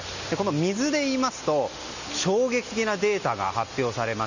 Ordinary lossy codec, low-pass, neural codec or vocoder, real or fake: none; 7.2 kHz; none; real